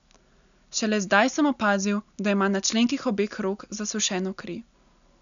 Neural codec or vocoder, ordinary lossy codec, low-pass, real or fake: none; none; 7.2 kHz; real